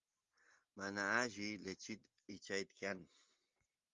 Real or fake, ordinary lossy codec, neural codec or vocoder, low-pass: real; Opus, 32 kbps; none; 7.2 kHz